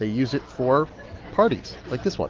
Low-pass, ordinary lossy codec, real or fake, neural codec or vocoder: 7.2 kHz; Opus, 16 kbps; real; none